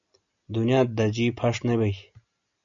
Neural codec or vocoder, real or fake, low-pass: none; real; 7.2 kHz